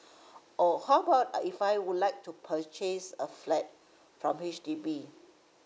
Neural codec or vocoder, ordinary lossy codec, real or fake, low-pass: none; none; real; none